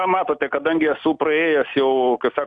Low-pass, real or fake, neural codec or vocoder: 10.8 kHz; real; none